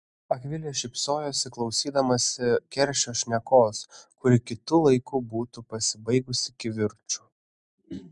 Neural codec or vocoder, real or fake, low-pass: none; real; 10.8 kHz